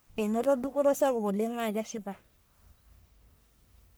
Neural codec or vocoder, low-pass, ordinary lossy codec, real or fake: codec, 44.1 kHz, 1.7 kbps, Pupu-Codec; none; none; fake